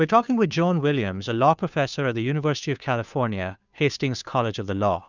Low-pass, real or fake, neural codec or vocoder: 7.2 kHz; fake; codec, 16 kHz, 2 kbps, FunCodec, trained on Chinese and English, 25 frames a second